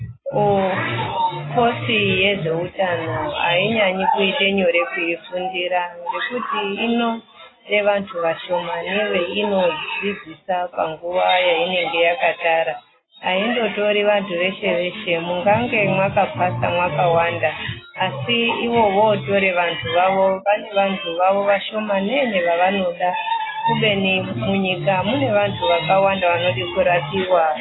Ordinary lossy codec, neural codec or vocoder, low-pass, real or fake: AAC, 16 kbps; none; 7.2 kHz; real